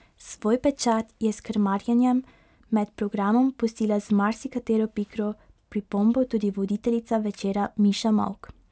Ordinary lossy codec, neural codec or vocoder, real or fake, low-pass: none; none; real; none